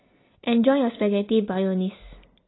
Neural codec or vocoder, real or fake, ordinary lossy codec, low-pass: none; real; AAC, 16 kbps; 7.2 kHz